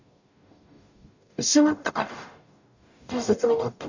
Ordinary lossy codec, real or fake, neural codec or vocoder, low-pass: none; fake; codec, 44.1 kHz, 0.9 kbps, DAC; 7.2 kHz